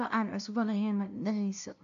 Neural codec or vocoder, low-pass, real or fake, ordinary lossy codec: codec, 16 kHz, 0.5 kbps, FunCodec, trained on LibriTTS, 25 frames a second; 7.2 kHz; fake; AAC, 96 kbps